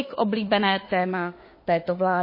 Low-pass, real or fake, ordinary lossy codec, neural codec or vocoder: 5.4 kHz; fake; MP3, 24 kbps; autoencoder, 48 kHz, 32 numbers a frame, DAC-VAE, trained on Japanese speech